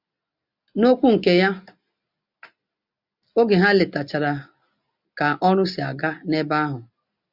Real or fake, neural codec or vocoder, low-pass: real; none; 5.4 kHz